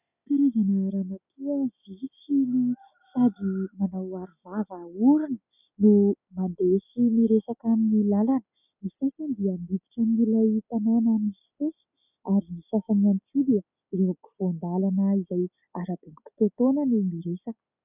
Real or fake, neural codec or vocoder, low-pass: real; none; 3.6 kHz